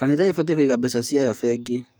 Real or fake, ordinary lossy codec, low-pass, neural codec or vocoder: fake; none; none; codec, 44.1 kHz, 2.6 kbps, SNAC